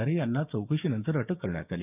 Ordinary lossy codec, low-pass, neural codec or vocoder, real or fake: none; 3.6 kHz; codec, 16 kHz, 6 kbps, DAC; fake